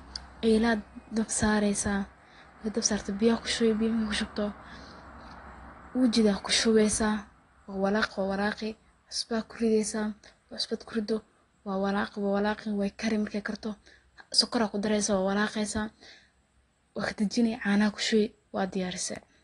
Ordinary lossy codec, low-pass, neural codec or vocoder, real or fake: AAC, 32 kbps; 10.8 kHz; none; real